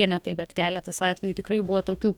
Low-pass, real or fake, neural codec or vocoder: 19.8 kHz; fake; codec, 44.1 kHz, 2.6 kbps, DAC